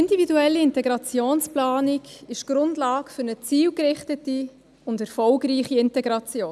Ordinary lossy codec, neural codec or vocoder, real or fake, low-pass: none; none; real; none